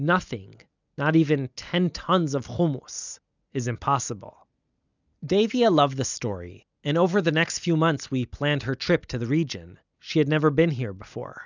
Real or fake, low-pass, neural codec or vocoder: real; 7.2 kHz; none